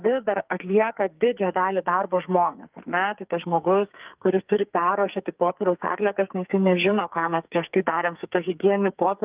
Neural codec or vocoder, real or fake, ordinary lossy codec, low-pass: codec, 44.1 kHz, 2.6 kbps, SNAC; fake; Opus, 24 kbps; 3.6 kHz